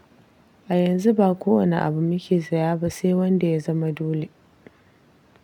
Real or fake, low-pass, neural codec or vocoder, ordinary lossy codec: real; 19.8 kHz; none; none